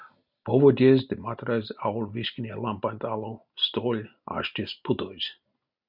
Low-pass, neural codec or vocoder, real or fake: 5.4 kHz; none; real